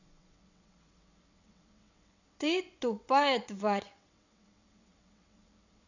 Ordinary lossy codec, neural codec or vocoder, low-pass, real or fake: none; vocoder, 22.05 kHz, 80 mel bands, WaveNeXt; 7.2 kHz; fake